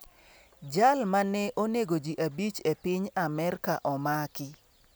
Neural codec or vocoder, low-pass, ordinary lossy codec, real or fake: none; none; none; real